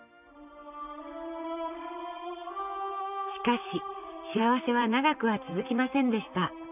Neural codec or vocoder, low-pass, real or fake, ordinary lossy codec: vocoder, 44.1 kHz, 128 mel bands, Pupu-Vocoder; 3.6 kHz; fake; none